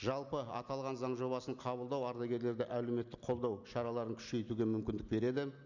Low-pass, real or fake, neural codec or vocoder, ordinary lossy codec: 7.2 kHz; real; none; none